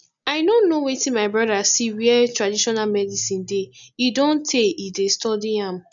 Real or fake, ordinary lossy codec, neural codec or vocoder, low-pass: real; none; none; 7.2 kHz